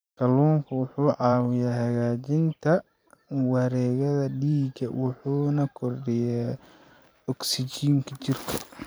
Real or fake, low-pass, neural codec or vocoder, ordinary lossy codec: fake; none; vocoder, 44.1 kHz, 128 mel bands every 512 samples, BigVGAN v2; none